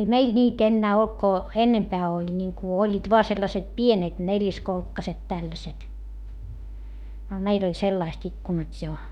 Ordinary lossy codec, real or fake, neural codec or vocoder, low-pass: none; fake; autoencoder, 48 kHz, 32 numbers a frame, DAC-VAE, trained on Japanese speech; 19.8 kHz